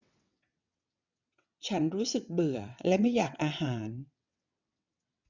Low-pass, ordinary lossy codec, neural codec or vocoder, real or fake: 7.2 kHz; none; vocoder, 22.05 kHz, 80 mel bands, WaveNeXt; fake